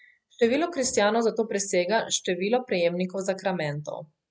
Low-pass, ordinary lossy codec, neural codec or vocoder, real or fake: none; none; none; real